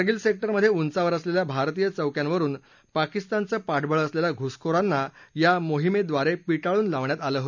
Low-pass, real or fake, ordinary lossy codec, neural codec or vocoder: 7.2 kHz; real; none; none